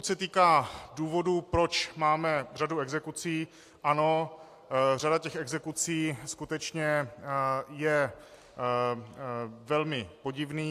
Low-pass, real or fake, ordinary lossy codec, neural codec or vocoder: 14.4 kHz; real; AAC, 64 kbps; none